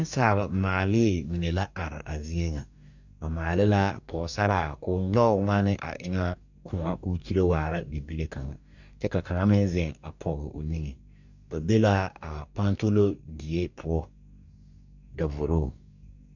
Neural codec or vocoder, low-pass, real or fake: codec, 44.1 kHz, 2.6 kbps, DAC; 7.2 kHz; fake